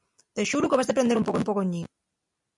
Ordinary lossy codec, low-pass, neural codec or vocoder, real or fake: MP3, 96 kbps; 10.8 kHz; none; real